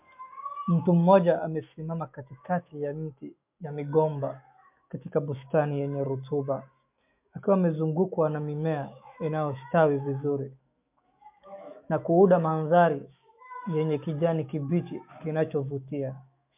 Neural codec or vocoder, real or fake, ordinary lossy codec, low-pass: autoencoder, 48 kHz, 128 numbers a frame, DAC-VAE, trained on Japanese speech; fake; MP3, 32 kbps; 3.6 kHz